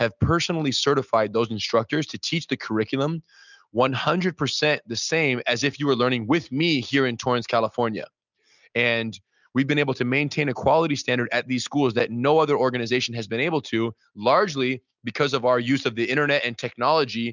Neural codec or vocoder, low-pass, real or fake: none; 7.2 kHz; real